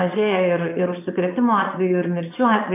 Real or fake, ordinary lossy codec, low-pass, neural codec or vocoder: fake; AAC, 24 kbps; 3.6 kHz; vocoder, 22.05 kHz, 80 mel bands, Vocos